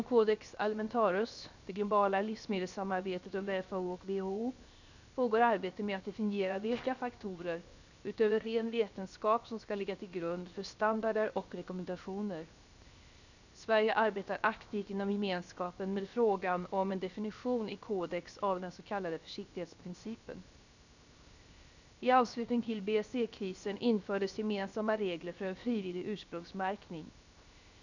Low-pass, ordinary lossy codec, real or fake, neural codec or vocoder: 7.2 kHz; none; fake; codec, 16 kHz, 0.7 kbps, FocalCodec